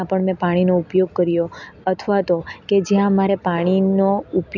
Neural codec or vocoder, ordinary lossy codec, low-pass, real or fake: none; none; 7.2 kHz; real